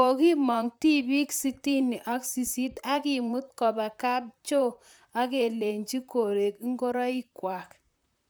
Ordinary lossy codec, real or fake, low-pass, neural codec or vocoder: none; fake; none; vocoder, 44.1 kHz, 128 mel bands, Pupu-Vocoder